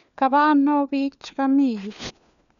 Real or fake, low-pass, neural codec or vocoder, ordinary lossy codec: fake; 7.2 kHz; codec, 16 kHz, 4 kbps, FunCodec, trained on LibriTTS, 50 frames a second; none